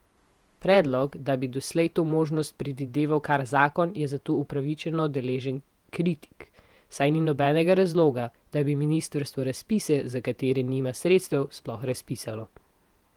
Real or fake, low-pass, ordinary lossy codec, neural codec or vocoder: fake; 19.8 kHz; Opus, 32 kbps; vocoder, 48 kHz, 128 mel bands, Vocos